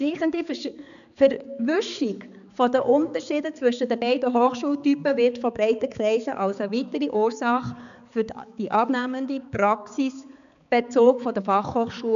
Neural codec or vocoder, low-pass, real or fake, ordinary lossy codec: codec, 16 kHz, 4 kbps, X-Codec, HuBERT features, trained on balanced general audio; 7.2 kHz; fake; none